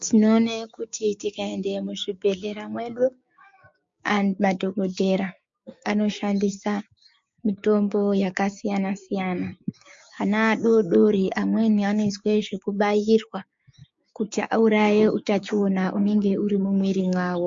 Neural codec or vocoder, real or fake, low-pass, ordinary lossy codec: codec, 16 kHz, 6 kbps, DAC; fake; 7.2 kHz; MP3, 48 kbps